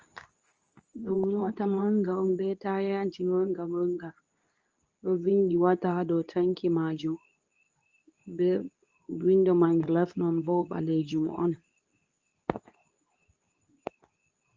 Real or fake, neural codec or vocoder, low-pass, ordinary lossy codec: fake; codec, 24 kHz, 0.9 kbps, WavTokenizer, medium speech release version 2; 7.2 kHz; Opus, 24 kbps